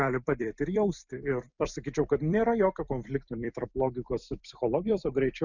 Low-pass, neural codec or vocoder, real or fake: 7.2 kHz; vocoder, 44.1 kHz, 128 mel bands every 256 samples, BigVGAN v2; fake